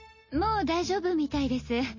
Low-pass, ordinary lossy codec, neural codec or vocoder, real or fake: 7.2 kHz; MP3, 32 kbps; none; real